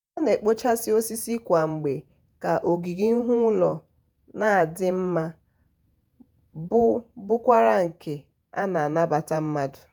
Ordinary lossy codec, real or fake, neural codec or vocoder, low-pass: none; fake; vocoder, 48 kHz, 128 mel bands, Vocos; none